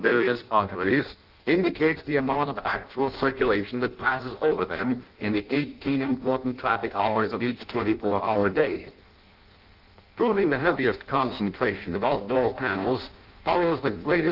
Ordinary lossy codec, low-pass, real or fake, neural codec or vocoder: Opus, 32 kbps; 5.4 kHz; fake; codec, 16 kHz in and 24 kHz out, 0.6 kbps, FireRedTTS-2 codec